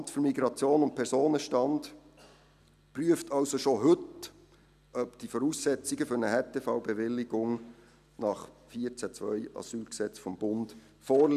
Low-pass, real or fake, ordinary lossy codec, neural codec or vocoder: 14.4 kHz; real; none; none